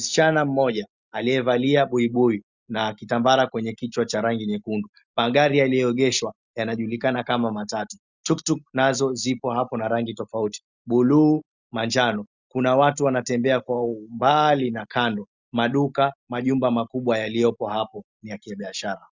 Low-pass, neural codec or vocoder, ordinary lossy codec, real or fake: 7.2 kHz; none; Opus, 64 kbps; real